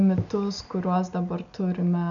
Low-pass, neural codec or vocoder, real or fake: 7.2 kHz; none; real